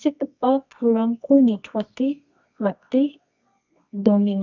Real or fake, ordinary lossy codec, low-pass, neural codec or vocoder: fake; none; 7.2 kHz; codec, 24 kHz, 0.9 kbps, WavTokenizer, medium music audio release